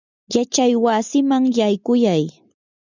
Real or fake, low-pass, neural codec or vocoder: real; 7.2 kHz; none